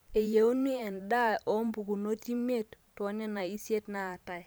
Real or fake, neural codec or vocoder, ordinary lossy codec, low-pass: fake; vocoder, 44.1 kHz, 128 mel bands every 512 samples, BigVGAN v2; none; none